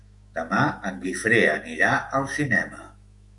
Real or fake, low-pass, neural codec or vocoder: fake; 10.8 kHz; autoencoder, 48 kHz, 128 numbers a frame, DAC-VAE, trained on Japanese speech